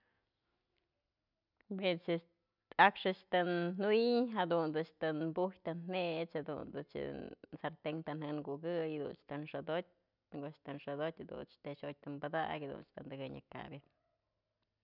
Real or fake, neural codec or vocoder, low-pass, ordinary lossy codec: real; none; 5.4 kHz; none